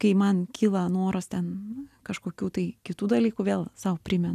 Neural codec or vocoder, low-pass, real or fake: none; 14.4 kHz; real